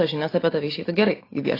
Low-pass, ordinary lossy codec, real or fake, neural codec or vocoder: 5.4 kHz; AAC, 24 kbps; real; none